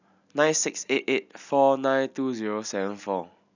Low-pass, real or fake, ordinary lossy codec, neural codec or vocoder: 7.2 kHz; real; none; none